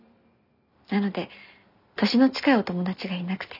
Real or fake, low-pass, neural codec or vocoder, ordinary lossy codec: real; 5.4 kHz; none; none